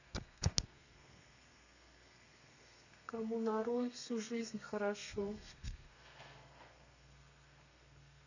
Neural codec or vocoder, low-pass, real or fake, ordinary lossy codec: codec, 32 kHz, 1.9 kbps, SNAC; 7.2 kHz; fake; none